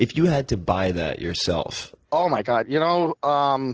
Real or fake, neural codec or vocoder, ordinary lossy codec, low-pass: real; none; Opus, 16 kbps; 7.2 kHz